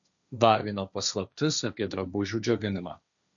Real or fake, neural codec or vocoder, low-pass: fake; codec, 16 kHz, 1.1 kbps, Voila-Tokenizer; 7.2 kHz